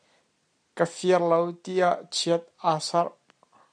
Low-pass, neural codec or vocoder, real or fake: 9.9 kHz; none; real